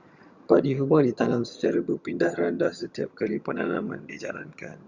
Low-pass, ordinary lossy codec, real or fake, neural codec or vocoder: 7.2 kHz; Opus, 64 kbps; fake; vocoder, 22.05 kHz, 80 mel bands, HiFi-GAN